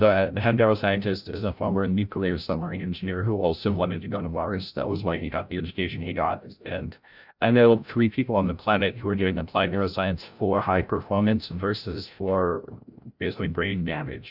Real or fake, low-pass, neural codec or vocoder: fake; 5.4 kHz; codec, 16 kHz, 0.5 kbps, FreqCodec, larger model